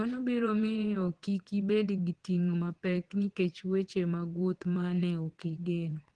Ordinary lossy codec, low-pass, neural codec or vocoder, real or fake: Opus, 16 kbps; 9.9 kHz; vocoder, 22.05 kHz, 80 mel bands, WaveNeXt; fake